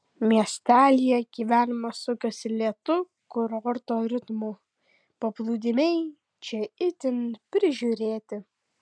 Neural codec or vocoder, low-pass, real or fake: none; 9.9 kHz; real